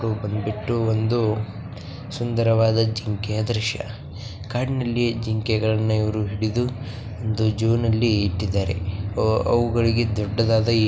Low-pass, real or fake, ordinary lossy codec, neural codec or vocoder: none; real; none; none